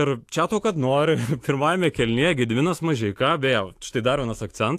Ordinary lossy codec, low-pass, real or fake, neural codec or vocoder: AAC, 64 kbps; 14.4 kHz; fake; autoencoder, 48 kHz, 128 numbers a frame, DAC-VAE, trained on Japanese speech